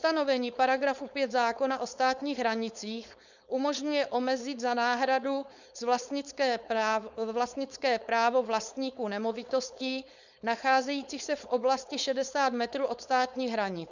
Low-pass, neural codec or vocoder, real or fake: 7.2 kHz; codec, 16 kHz, 4.8 kbps, FACodec; fake